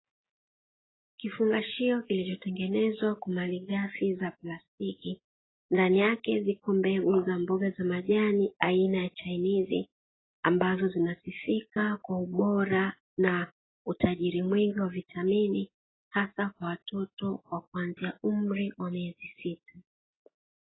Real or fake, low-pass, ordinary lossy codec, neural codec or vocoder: real; 7.2 kHz; AAC, 16 kbps; none